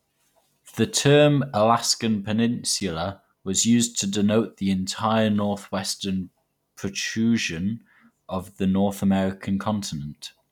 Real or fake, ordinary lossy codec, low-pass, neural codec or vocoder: real; none; 19.8 kHz; none